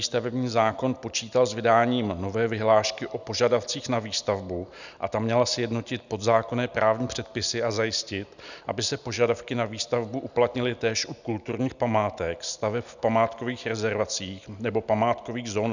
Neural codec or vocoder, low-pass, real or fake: none; 7.2 kHz; real